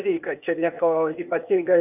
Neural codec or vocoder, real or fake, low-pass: codec, 16 kHz, 0.8 kbps, ZipCodec; fake; 3.6 kHz